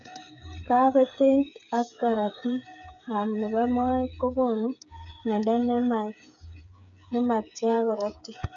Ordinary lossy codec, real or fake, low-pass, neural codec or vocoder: none; fake; 7.2 kHz; codec, 16 kHz, 8 kbps, FreqCodec, smaller model